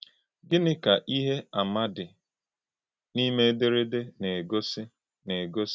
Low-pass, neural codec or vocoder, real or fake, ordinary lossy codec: none; none; real; none